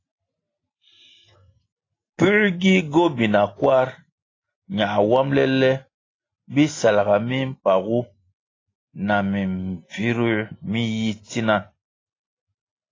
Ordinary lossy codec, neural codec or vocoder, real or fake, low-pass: AAC, 32 kbps; none; real; 7.2 kHz